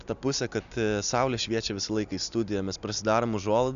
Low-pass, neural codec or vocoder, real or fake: 7.2 kHz; none; real